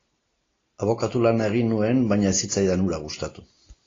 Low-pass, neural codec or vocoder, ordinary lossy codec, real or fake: 7.2 kHz; none; AAC, 32 kbps; real